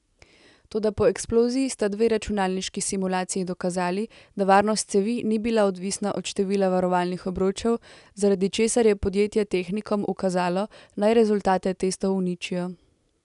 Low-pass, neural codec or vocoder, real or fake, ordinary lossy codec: 10.8 kHz; none; real; none